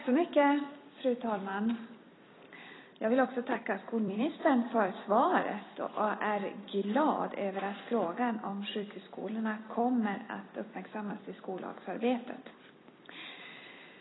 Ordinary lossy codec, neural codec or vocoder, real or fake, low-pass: AAC, 16 kbps; vocoder, 44.1 kHz, 128 mel bands every 256 samples, BigVGAN v2; fake; 7.2 kHz